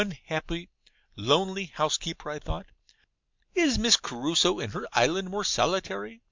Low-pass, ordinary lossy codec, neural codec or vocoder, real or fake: 7.2 kHz; MP3, 64 kbps; none; real